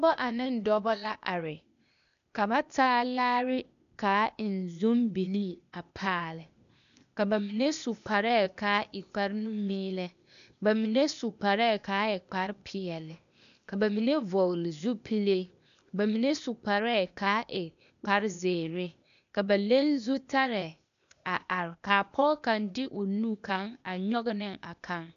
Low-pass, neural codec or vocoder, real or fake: 7.2 kHz; codec, 16 kHz, 0.8 kbps, ZipCodec; fake